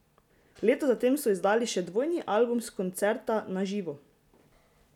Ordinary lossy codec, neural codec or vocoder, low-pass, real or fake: none; none; 19.8 kHz; real